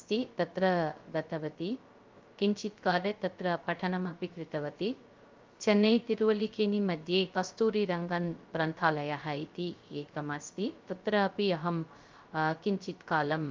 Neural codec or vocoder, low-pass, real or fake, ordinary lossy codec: codec, 16 kHz, 0.3 kbps, FocalCodec; 7.2 kHz; fake; Opus, 24 kbps